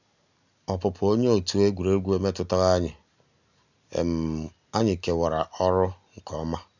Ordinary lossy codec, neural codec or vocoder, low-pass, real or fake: none; none; 7.2 kHz; real